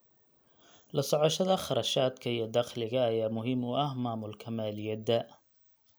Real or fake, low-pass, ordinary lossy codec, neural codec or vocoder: real; none; none; none